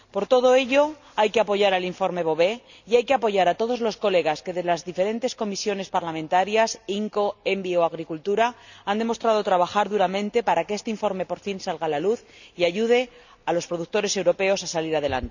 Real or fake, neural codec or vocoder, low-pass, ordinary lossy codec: real; none; 7.2 kHz; none